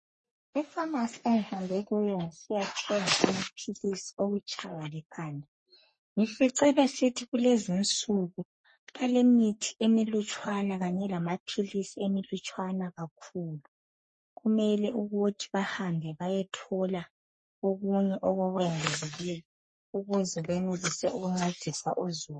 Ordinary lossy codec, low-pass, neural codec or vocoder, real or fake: MP3, 32 kbps; 10.8 kHz; codec, 44.1 kHz, 3.4 kbps, Pupu-Codec; fake